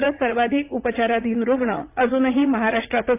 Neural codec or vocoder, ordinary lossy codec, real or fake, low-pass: vocoder, 44.1 kHz, 80 mel bands, Vocos; AAC, 24 kbps; fake; 3.6 kHz